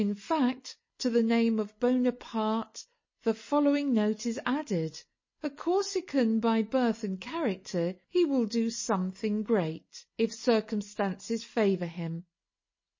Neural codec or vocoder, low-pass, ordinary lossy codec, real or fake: none; 7.2 kHz; MP3, 32 kbps; real